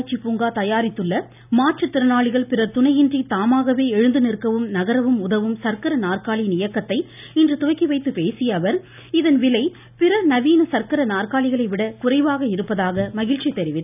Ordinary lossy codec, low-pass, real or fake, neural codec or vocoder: none; 3.6 kHz; real; none